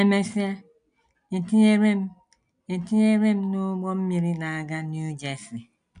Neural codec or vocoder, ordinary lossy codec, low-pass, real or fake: none; none; 9.9 kHz; real